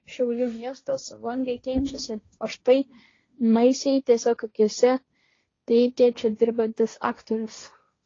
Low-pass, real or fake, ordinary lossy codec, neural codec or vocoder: 7.2 kHz; fake; AAC, 32 kbps; codec, 16 kHz, 1.1 kbps, Voila-Tokenizer